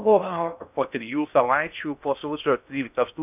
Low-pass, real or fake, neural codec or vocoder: 3.6 kHz; fake; codec, 16 kHz in and 24 kHz out, 0.6 kbps, FocalCodec, streaming, 4096 codes